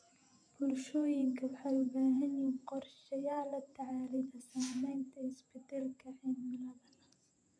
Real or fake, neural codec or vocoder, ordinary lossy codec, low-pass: fake; vocoder, 48 kHz, 128 mel bands, Vocos; none; 9.9 kHz